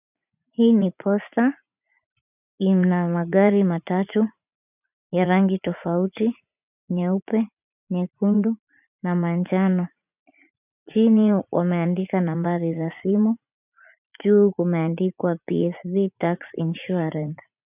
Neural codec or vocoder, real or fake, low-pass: vocoder, 44.1 kHz, 80 mel bands, Vocos; fake; 3.6 kHz